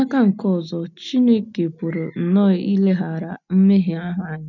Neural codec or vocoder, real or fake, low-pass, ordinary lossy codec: none; real; 7.2 kHz; none